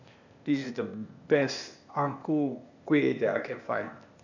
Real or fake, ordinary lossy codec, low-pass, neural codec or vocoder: fake; none; 7.2 kHz; codec, 16 kHz, 0.8 kbps, ZipCodec